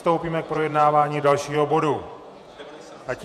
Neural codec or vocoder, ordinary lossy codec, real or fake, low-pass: vocoder, 48 kHz, 128 mel bands, Vocos; MP3, 96 kbps; fake; 14.4 kHz